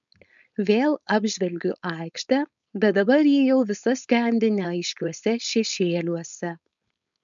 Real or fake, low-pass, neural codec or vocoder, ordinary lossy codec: fake; 7.2 kHz; codec, 16 kHz, 4.8 kbps, FACodec; MP3, 96 kbps